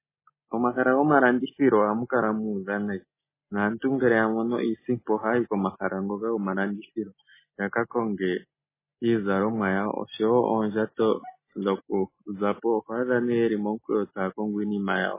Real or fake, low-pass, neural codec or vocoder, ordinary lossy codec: real; 3.6 kHz; none; MP3, 16 kbps